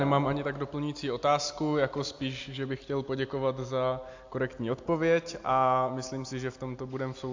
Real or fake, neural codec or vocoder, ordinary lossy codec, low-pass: real; none; AAC, 48 kbps; 7.2 kHz